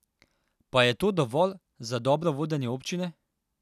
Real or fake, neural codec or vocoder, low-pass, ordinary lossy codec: real; none; 14.4 kHz; none